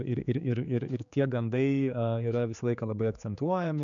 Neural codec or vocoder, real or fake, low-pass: codec, 16 kHz, 4 kbps, X-Codec, HuBERT features, trained on general audio; fake; 7.2 kHz